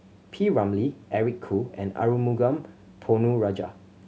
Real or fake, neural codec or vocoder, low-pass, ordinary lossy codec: real; none; none; none